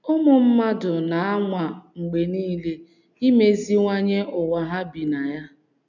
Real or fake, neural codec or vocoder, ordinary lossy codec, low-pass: real; none; none; 7.2 kHz